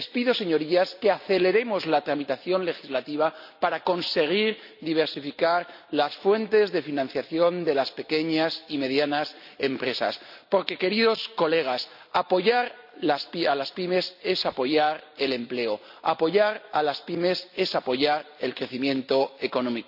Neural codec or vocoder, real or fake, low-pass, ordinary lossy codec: none; real; 5.4 kHz; none